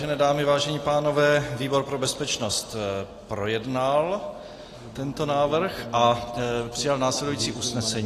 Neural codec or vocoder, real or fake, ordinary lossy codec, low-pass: none; real; AAC, 48 kbps; 14.4 kHz